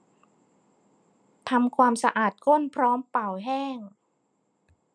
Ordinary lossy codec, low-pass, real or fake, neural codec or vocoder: none; 9.9 kHz; real; none